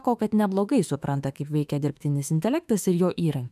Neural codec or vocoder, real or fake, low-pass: autoencoder, 48 kHz, 32 numbers a frame, DAC-VAE, trained on Japanese speech; fake; 14.4 kHz